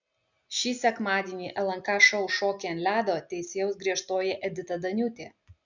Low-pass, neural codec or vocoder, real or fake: 7.2 kHz; none; real